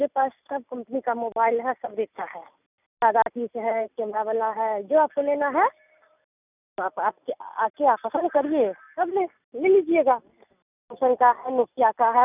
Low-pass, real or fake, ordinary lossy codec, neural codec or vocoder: 3.6 kHz; real; none; none